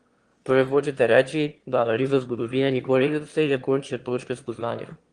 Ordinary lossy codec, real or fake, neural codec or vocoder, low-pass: Opus, 24 kbps; fake; autoencoder, 22.05 kHz, a latent of 192 numbers a frame, VITS, trained on one speaker; 9.9 kHz